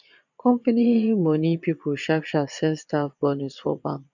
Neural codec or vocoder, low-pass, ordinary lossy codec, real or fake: vocoder, 22.05 kHz, 80 mel bands, Vocos; 7.2 kHz; none; fake